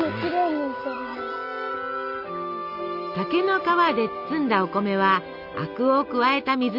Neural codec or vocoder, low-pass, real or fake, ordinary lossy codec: none; 5.4 kHz; real; none